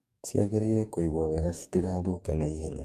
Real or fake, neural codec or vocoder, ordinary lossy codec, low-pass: fake; codec, 44.1 kHz, 2.6 kbps, DAC; AAC, 96 kbps; 14.4 kHz